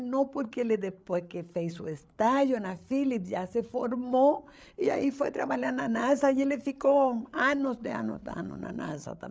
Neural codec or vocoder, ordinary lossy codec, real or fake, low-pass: codec, 16 kHz, 8 kbps, FreqCodec, larger model; none; fake; none